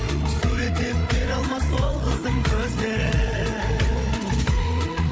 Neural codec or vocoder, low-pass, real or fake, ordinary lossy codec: codec, 16 kHz, 8 kbps, FreqCodec, larger model; none; fake; none